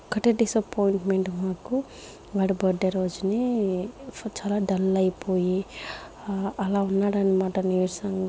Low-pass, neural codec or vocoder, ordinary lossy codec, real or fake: none; none; none; real